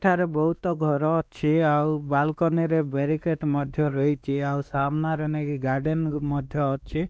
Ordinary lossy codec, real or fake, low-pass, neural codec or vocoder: none; fake; none; codec, 16 kHz, 2 kbps, X-Codec, WavLM features, trained on Multilingual LibriSpeech